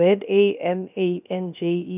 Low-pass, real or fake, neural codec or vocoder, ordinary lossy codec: 3.6 kHz; fake; codec, 16 kHz, 0.3 kbps, FocalCodec; none